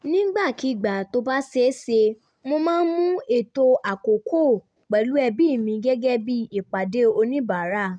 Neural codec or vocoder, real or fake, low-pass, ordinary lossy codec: none; real; 9.9 kHz; none